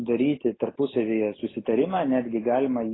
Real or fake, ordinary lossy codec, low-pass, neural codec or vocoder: real; AAC, 16 kbps; 7.2 kHz; none